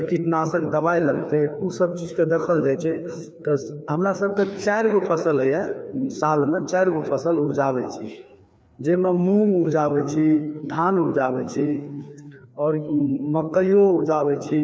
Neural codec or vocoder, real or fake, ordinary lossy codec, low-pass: codec, 16 kHz, 2 kbps, FreqCodec, larger model; fake; none; none